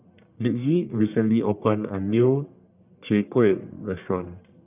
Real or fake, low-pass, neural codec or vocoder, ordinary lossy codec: fake; 3.6 kHz; codec, 44.1 kHz, 1.7 kbps, Pupu-Codec; none